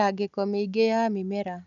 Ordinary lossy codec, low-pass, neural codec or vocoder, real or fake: none; 7.2 kHz; none; real